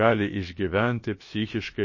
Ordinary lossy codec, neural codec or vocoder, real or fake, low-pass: MP3, 32 kbps; codec, 16 kHz, about 1 kbps, DyCAST, with the encoder's durations; fake; 7.2 kHz